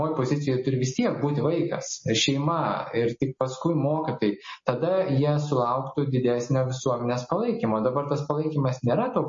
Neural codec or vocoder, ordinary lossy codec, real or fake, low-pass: none; MP3, 32 kbps; real; 7.2 kHz